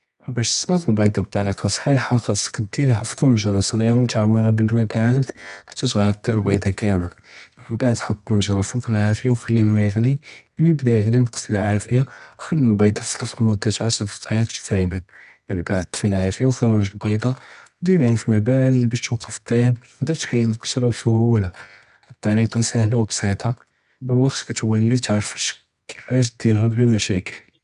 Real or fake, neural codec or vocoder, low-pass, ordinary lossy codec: fake; codec, 24 kHz, 0.9 kbps, WavTokenizer, medium music audio release; 10.8 kHz; none